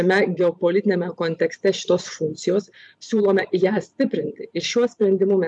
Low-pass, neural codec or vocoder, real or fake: 10.8 kHz; vocoder, 44.1 kHz, 128 mel bands every 512 samples, BigVGAN v2; fake